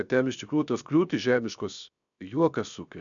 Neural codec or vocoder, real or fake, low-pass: codec, 16 kHz, 0.7 kbps, FocalCodec; fake; 7.2 kHz